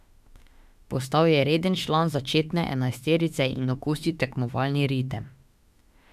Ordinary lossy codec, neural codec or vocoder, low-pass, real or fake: none; autoencoder, 48 kHz, 32 numbers a frame, DAC-VAE, trained on Japanese speech; 14.4 kHz; fake